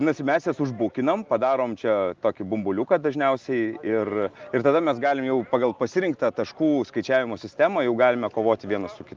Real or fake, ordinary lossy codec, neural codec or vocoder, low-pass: real; Opus, 32 kbps; none; 7.2 kHz